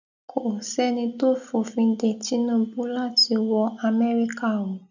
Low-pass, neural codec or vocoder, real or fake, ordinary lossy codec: 7.2 kHz; none; real; none